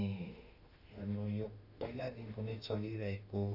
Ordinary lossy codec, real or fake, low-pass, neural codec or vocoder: none; fake; 5.4 kHz; autoencoder, 48 kHz, 32 numbers a frame, DAC-VAE, trained on Japanese speech